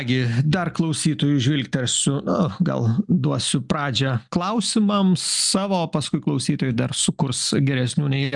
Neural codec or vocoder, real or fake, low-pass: none; real; 10.8 kHz